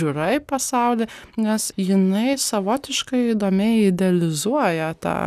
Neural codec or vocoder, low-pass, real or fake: none; 14.4 kHz; real